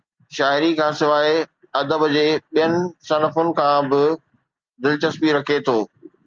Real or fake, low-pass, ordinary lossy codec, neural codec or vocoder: real; 7.2 kHz; Opus, 24 kbps; none